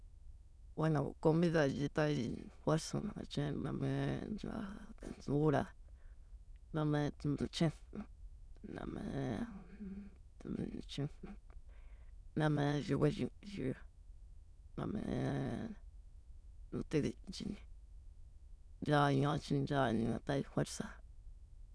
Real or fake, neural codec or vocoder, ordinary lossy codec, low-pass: fake; autoencoder, 22.05 kHz, a latent of 192 numbers a frame, VITS, trained on many speakers; none; none